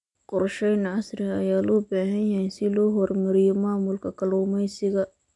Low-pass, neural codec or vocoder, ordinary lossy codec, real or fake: 10.8 kHz; none; none; real